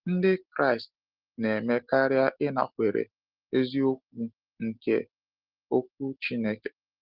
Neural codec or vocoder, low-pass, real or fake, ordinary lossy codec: none; 5.4 kHz; real; Opus, 16 kbps